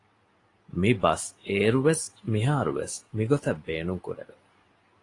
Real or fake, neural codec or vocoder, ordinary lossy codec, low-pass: real; none; AAC, 32 kbps; 10.8 kHz